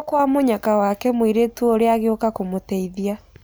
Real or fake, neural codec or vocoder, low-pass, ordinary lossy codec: real; none; none; none